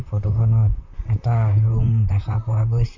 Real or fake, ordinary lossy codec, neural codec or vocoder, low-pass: fake; MP3, 48 kbps; vocoder, 44.1 kHz, 128 mel bands, Pupu-Vocoder; 7.2 kHz